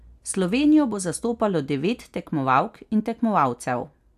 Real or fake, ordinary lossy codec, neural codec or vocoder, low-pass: real; AAC, 96 kbps; none; 14.4 kHz